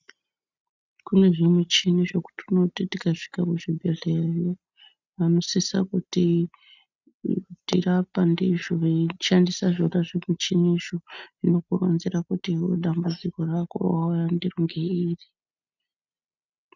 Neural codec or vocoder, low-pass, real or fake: none; 7.2 kHz; real